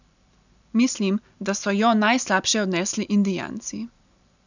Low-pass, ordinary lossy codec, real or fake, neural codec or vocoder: 7.2 kHz; none; real; none